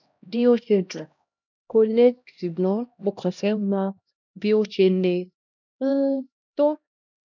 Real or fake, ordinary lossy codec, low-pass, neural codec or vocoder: fake; none; 7.2 kHz; codec, 16 kHz, 1 kbps, X-Codec, HuBERT features, trained on LibriSpeech